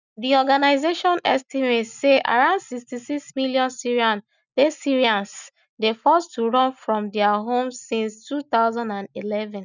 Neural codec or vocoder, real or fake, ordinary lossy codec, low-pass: none; real; none; 7.2 kHz